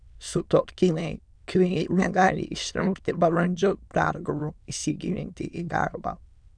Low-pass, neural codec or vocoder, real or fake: 9.9 kHz; autoencoder, 22.05 kHz, a latent of 192 numbers a frame, VITS, trained on many speakers; fake